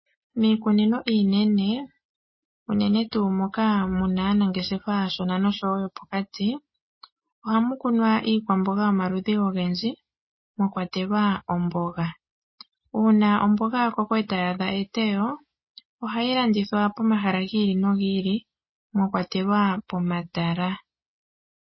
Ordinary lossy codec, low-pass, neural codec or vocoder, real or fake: MP3, 24 kbps; 7.2 kHz; none; real